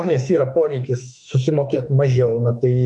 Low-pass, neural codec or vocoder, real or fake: 10.8 kHz; autoencoder, 48 kHz, 32 numbers a frame, DAC-VAE, trained on Japanese speech; fake